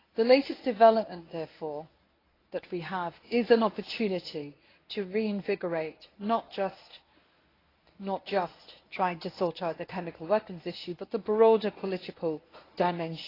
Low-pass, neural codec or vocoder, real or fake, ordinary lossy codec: 5.4 kHz; codec, 24 kHz, 0.9 kbps, WavTokenizer, medium speech release version 1; fake; AAC, 24 kbps